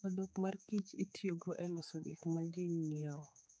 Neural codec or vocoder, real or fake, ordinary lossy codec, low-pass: codec, 16 kHz, 4 kbps, X-Codec, HuBERT features, trained on general audio; fake; none; none